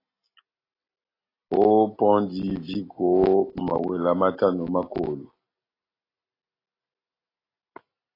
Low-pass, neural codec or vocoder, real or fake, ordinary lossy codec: 5.4 kHz; none; real; AAC, 24 kbps